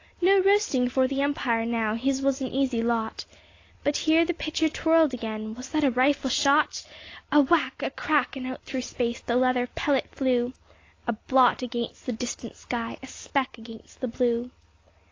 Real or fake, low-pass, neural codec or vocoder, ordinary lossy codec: real; 7.2 kHz; none; AAC, 32 kbps